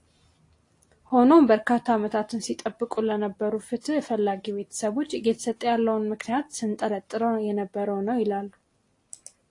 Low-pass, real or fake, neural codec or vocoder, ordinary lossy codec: 10.8 kHz; real; none; AAC, 48 kbps